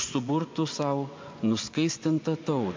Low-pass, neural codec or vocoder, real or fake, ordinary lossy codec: 7.2 kHz; vocoder, 24 kHz, 100 mel bands, Vocos; fake; MP3, 48 kbps